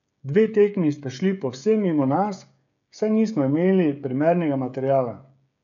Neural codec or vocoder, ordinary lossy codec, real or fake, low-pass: codec, 16 kHz, 16 kbps, FreqCodec, smaller model; none; fake; 7.2 kHz